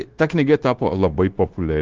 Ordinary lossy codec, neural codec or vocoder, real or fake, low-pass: Opus, 16 kbps; codec, 16 kHz, 0.9 kbps, LongCat-Audio-Codec; fake; 7.2 kHz